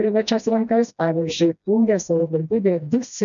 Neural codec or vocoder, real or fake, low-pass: codec, 16 kHz, 1 kbps, FreqCodec, smaller model; fake; 7.2 kHz